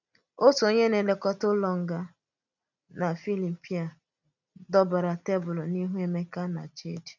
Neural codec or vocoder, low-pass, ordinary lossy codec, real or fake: none; 7.2 kHz; none; real